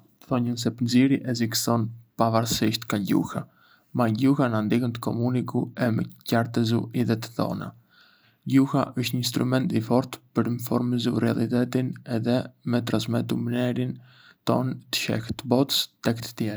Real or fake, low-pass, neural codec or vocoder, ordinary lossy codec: real; none; none; none